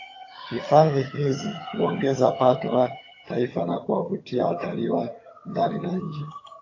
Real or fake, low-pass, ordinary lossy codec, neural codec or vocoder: fake; 7.2 kHz; AAC, 32 kbps; vocoder, 22.05 kHz, 80 mel bands, HiFi-GAN